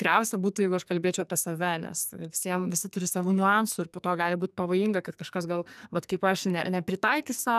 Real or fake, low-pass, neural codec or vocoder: fake; 14.4 kHz; codec, 44.1 kHz, 2.6 kbps, SNAC